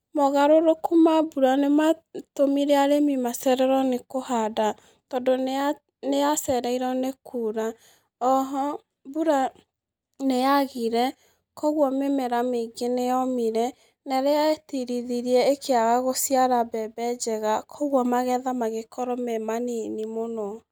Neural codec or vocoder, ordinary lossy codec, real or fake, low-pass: none; none; real; none